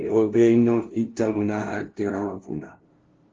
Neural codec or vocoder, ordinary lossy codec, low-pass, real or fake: codec, 16 kHz, 0.5 kbps, FunCodec, trained on LibriTTS, 25 frames a second; Opus, 16 kbps; 7.2 kHz; fake